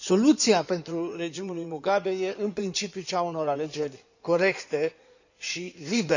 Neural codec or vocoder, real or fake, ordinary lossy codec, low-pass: codec, 16 kHz in and 24 kHz out, 2.2 kbps, FireRedTTS-2 codec; fake; none; 7.2 kHz